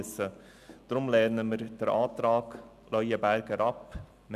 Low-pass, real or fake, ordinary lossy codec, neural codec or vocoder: 14.4 kHz; real; none; none